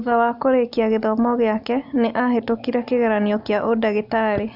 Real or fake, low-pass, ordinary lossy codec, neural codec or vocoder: real; 5.4 kHz; Opus, 64 kbps; none